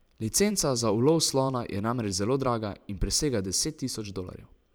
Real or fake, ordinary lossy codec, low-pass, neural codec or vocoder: fake; none; none; vocoder, 44.1 kHz, 128 mel bands every 512 samples, BigVGAN v2